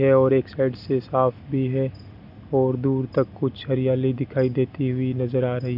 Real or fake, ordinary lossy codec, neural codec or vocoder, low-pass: real; none; none; 5.4 kHz